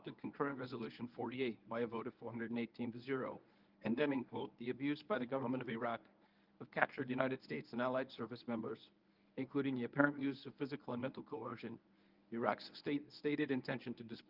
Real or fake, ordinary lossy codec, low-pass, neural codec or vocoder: fake; Opus, 24 kbps; 5.4 kHz; codec, 24 kHz, 0.9 kbps, WavTokenizer, medium speech release version 1